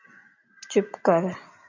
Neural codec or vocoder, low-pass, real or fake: none; 7.2 kHz; real